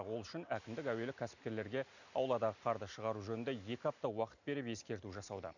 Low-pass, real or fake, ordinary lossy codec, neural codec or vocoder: 7.2 kHz; real; none; none